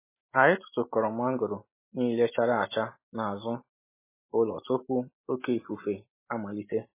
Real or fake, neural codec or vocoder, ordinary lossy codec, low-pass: real; none; MP3, 16 kbps; 3.6 kHz